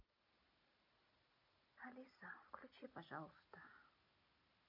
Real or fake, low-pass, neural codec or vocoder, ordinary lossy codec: real; 5.4 kHz; none; MP3, 32 kbps